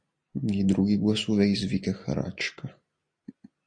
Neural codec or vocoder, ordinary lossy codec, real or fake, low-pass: none; AAC, 64 kbps; real; 9.9 kHz